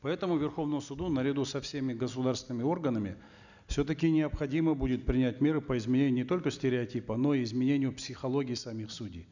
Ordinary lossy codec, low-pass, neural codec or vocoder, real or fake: none; 7.2 kHz; none; real